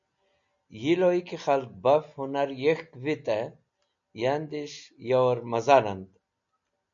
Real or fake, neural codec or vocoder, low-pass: real; none; 7.2 kHz